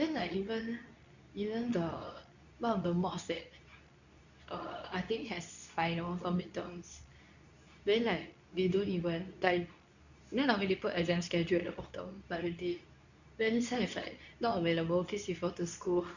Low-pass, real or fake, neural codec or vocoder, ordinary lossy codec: 7.2 kHz; fake; codec, 24 kHz, 0.9 kbps, WavTokenizer, medium speech release version 2; none